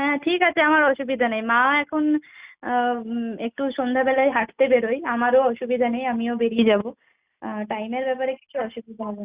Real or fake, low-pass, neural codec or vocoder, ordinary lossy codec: real; 3.6 kHz; none; Opus, 64 kbps